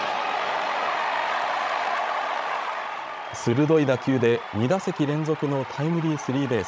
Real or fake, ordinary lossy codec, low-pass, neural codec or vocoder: fake; none; none; codec, 16 kHz, 16 kbps, FreqCodec, larger model